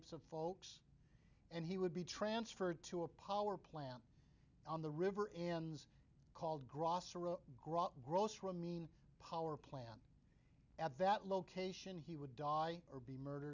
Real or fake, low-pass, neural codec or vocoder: real; 7.2 kHz; none